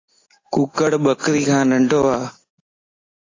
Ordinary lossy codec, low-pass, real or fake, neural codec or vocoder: AAC, 32 kbps; 7.2 kHz; real; none